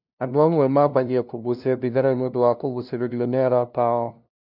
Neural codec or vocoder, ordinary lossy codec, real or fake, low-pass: codec, 16 kHz, 0.5 kbps, FunCodec, trained on LibriTTS, 25 frames a second; none; fake; 5.4 kHz